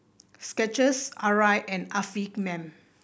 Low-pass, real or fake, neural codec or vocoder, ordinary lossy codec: none; real; none; none